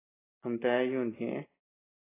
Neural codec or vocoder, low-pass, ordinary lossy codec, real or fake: none; 3.6 kHz; AAC, 16 kbps; real